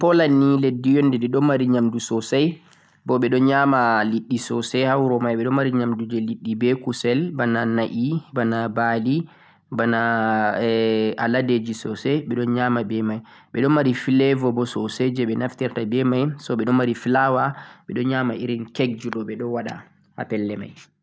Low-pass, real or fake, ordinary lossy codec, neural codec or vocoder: none; real; none; none